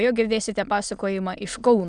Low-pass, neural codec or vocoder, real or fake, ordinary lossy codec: 9.9 kHz; autoencoder, 22.05 kHz, a latent of 192 numbers a frame, VITS, trained on many speakers; fake; Opus, 64 kbps